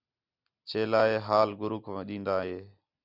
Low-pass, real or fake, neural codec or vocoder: 5.4 kHz; real; none